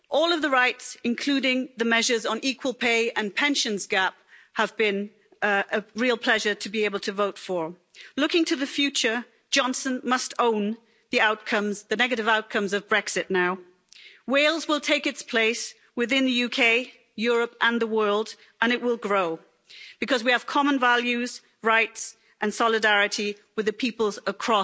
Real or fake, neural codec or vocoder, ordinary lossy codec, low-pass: real; none; none; none